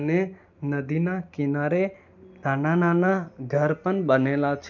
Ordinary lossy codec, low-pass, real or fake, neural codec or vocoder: none; 7.2 kHz; real; none